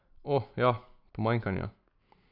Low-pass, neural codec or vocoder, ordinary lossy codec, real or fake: 5.4 kHz; none; none; real